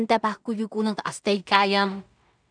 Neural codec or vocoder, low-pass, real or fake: codec, 16 kHz in and 24 kHz out, 0.4 kbps, LongCat-Audio-Codec, two codebook decoder; 9.9 kHz; fake